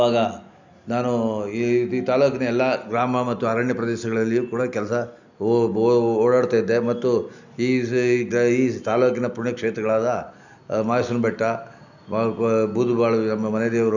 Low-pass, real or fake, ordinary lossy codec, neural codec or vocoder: 7.2 kHz; real; none; none